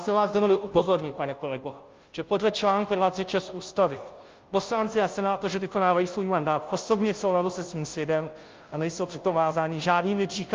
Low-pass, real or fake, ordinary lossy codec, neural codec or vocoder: 7.2 kHz; fake; Opus, 32 kbps; codec, 16 kHz, 0.5 kbps, FunCodec, trained on Chinese and English, 25 frames a second